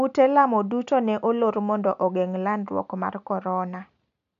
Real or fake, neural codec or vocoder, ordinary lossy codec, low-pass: real; none; none; 7.2 kHz